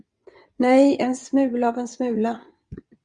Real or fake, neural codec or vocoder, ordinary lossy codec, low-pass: fake; vocoder, 22.05 kHz, 80 mel bands, WaveNeXt; Opus, 64 kbps; 9.9 kHz